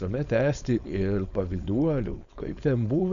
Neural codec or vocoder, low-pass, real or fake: codec, 16 kHz, 4.8 kbps, FACodec; 7.2 kHz; fake